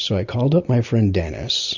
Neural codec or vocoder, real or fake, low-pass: none; real; 7.2 kHz